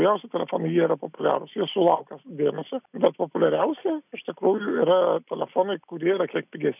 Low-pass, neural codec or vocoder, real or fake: 3.6 kHz; none; real